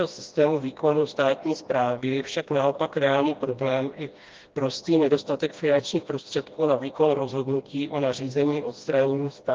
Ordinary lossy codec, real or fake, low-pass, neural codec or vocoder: Opus, 32 kbps; fake; 7.2 kHz; codec, 16 kHz, 1 kbps, FreqCodec, smaller model